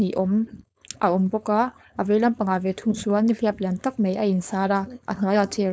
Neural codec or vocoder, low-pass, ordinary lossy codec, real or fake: codec, 16 kHz, 4.8 kbps, FACodec; none; none; fake